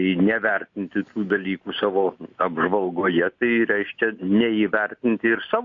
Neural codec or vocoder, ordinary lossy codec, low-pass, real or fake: none; AAC, 48 kbps; 7.2 kHz; real